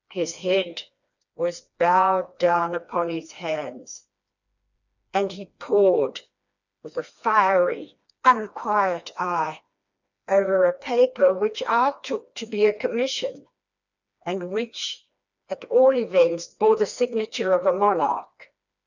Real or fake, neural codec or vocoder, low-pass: fake; codec, 16 kHz, 2 kbps, FreqCodec, smaller model; 7.2 kHz